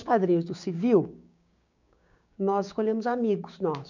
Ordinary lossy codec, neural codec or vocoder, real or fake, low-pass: none; codec, 16 kHz, 6 kbps, DAC; fake; 7.2 kHz